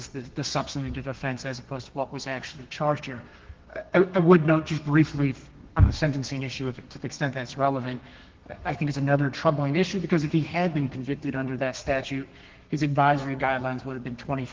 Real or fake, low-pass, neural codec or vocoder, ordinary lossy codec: fake; 7.2 kHz; codec, 32 kHz, 1.9 kbps, SNAC; Opus, 16 kbps